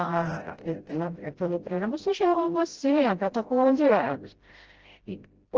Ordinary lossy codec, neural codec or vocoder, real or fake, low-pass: Opus, 16 kbps; codec, 16 kHz, 0.5 kbps, FreqCodec, smaller model; fake; 7.2 kHz